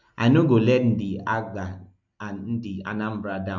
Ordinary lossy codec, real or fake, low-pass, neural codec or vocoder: MP3, 64 kbps; real; 7.2 kHz; none